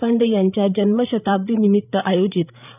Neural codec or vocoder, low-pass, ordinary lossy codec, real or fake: codec, 16 kHz, 8 kbps, FreqCodec, larger model; 3.6 kHz; none; fake